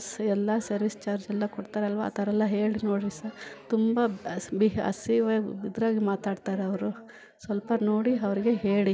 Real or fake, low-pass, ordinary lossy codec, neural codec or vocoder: real; none; none; none